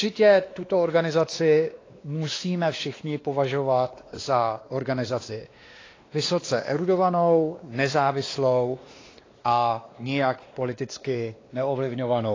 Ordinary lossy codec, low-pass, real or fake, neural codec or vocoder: AAC, 32 kbps; 7.2 kHz; fake; codec, 16 kHz, 2 kbps, X-Codec, WavLM features, trained on Multilingual LibriSpeech